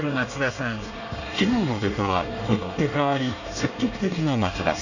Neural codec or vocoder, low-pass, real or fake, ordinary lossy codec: codec, 24 kHz, 1 kbps, SNAC; 7.2 kHz; fake; none